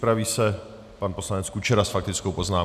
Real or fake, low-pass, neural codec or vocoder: real; 14.4 kHz; none